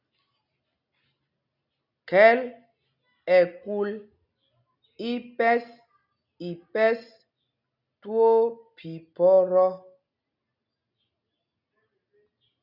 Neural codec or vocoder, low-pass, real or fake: none; 5.4 kHz; real